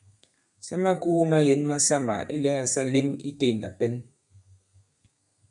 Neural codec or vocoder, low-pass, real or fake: codec, 32 kHz, 1.9 kbps, SNAC; 10.8 kHz; fake